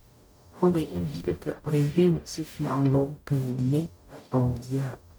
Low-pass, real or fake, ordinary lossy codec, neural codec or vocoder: none; fake; none; codec, 44.1 kHz, 0.9 kbps, DAC